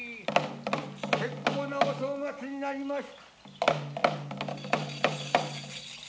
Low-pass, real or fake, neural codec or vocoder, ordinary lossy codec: none; real; none; none